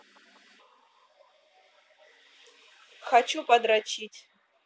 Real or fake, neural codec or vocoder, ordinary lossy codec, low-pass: real; none; none; none